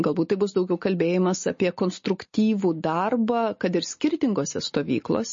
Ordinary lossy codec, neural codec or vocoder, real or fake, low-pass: MP3, 32 kbps; none; real; 7.2 kHz